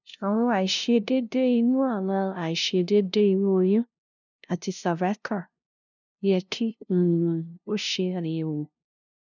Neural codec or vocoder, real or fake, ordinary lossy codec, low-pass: codec, 16 kHz, 0.5 kbps, FunCodec, trained on LibriTTS, 25 frames a second; fake; none; 7.2 kHz